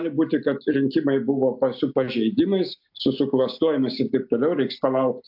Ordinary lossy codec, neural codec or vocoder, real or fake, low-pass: AAC, 48 kbps; none; real; 5.4 kHz